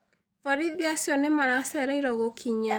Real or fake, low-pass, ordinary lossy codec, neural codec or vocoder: fake; none; none; codec, 44.1 kHz, 7.8 kbps, DAC